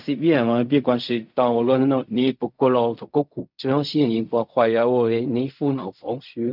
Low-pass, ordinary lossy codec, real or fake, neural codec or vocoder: 5.4 kHz; none; fake; codec, 16 kHz in and 24 kHz out, 0.4 kbps, LongCat-Audio-Codec, fine tuned four codebook decoder